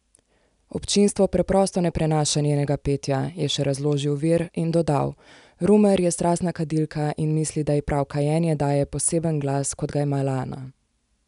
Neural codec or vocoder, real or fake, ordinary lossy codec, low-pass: none; real; none; 10.8 kHz